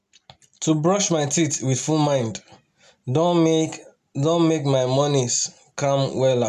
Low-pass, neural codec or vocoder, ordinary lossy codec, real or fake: 14.4 kHz; none; none; real